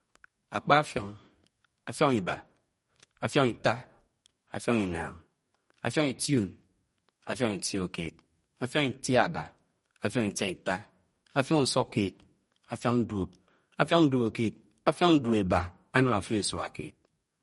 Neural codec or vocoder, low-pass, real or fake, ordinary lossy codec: codec, 44.1 kHz, 2.6 kbps, DAC; 19.8 kHz; fake; MP3, 48 kbps